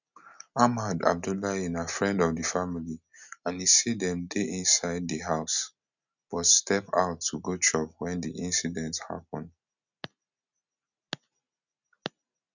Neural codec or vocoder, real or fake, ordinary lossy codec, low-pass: none; real; none; 7.2 kHz